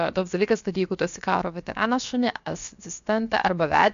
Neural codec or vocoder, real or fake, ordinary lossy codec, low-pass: codec, 16 kHz, 0.7 kbps, FocalCodec; fake; MP3, 64 kbps; 7.2 kHz